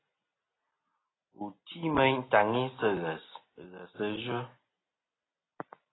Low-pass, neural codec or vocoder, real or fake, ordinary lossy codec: 7.2 kHz; none; real; AAC, 16 kbps